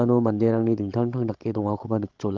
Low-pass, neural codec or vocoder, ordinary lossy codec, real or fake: 7.2 kHz; codec, 16 kHz, 16 kbps, FreqCodec, larger model; Opus, 16 kbps; fake